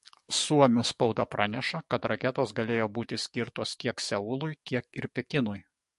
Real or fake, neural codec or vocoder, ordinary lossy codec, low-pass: fake; codec, 44.1 kHz, 7.8 kbps, DAC; MP3, 48 kbps; 14.4 kHz